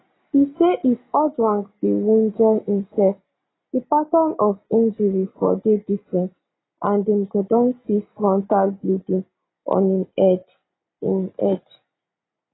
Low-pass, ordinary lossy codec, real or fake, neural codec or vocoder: 7.2 kHz; AAC, 16 kbps; real; none